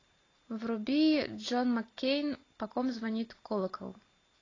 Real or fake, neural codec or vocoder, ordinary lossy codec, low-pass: real; none; AAC, 32 kbps; 7.2 kHz